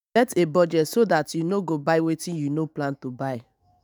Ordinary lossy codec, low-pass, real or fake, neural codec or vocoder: none; none; fake; autoencoder, 48 kHz, 128 numbers a frame, DAC-VAE, trained on Japanese speech